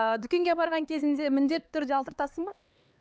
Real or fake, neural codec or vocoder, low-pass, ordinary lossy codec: fake; codec, 16 kHz, 2 kbps, X-Codec, HuBERT features, trained on LibriSpeech; none; none